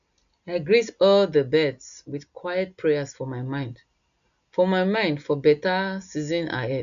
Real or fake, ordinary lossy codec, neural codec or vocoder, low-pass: real; none; none; 7.2 kHz